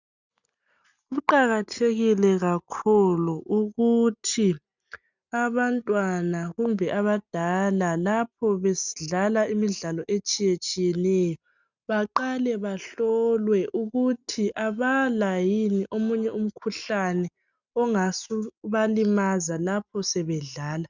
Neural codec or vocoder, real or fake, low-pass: none; real; 7.2 kHz